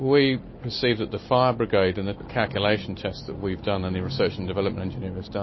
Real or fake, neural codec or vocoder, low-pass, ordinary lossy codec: real; none; 7.2 kHz; MP3, 24 kbps